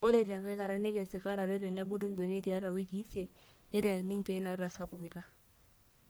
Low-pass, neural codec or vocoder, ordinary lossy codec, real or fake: none; codec, 44.1 kHz, 1.7 kbps, Pupu-Codec; none; fake